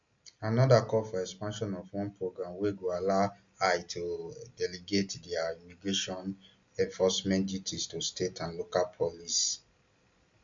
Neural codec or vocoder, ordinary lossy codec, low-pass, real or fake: none; MP3, 64 kbps; 7.2 kHz; real